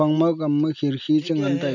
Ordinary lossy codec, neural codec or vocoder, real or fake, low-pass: none; none; real; 7.2 kHz